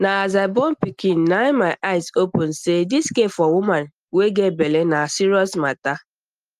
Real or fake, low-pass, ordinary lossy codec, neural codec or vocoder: real; 14.4 kHz; Opus, 32 kbps; none